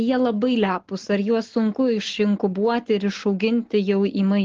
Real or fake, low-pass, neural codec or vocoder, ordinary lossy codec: real; 7.2 kHz; none; Opus, 16 kbps